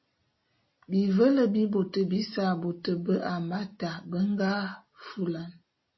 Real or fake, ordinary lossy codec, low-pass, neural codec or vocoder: real; MP3, 24 kbps; 7.2 kHz; none